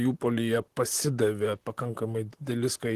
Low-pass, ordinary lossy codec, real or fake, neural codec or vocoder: 14.4 kHz; Opus, 16 kbps; fake; vocoder, 44.1 kHz, 128 mel bands, Pupu-Vocoder